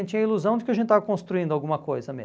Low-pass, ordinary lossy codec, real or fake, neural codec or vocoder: none; none; real; none